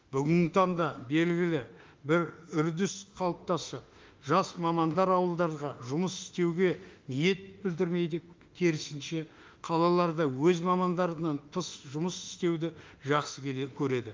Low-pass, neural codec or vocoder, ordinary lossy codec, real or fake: 7.2 kHz; autoencoder, 48 kHz, 32 numbers a frame, DAC-VAE, trained on Japanese speech; Opus, 32 kbps; fake